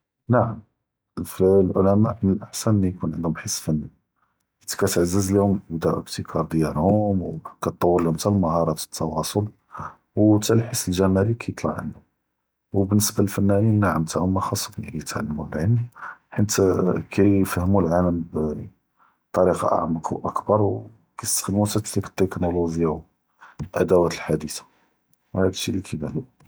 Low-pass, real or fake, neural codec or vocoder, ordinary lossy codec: none; real; none; none